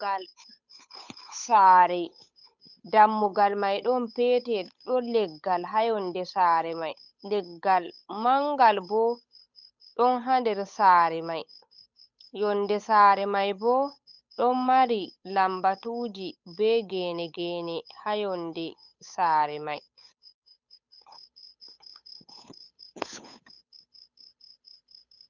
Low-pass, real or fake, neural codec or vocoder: 7.2 kHz; fake; codec, 16 kHz, 8 kbps, FunCodec, trained on Chinese and English, 25 frames a second